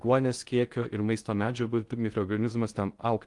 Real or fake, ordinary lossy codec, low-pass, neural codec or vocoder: fake; Opus, 32 kbps; 10.8 kHz; codec, 16 kHz in and 24 kHz out, 0.6 kbps, FocalCodec, streaming, 2048 codes